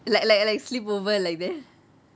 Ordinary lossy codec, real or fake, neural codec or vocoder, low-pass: none; real; none; none